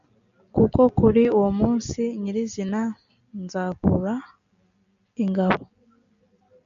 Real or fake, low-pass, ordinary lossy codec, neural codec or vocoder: real; 7.2 kHz; AAC, 96 kbps; none